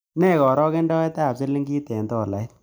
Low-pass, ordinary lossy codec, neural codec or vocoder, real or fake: none; none; none; real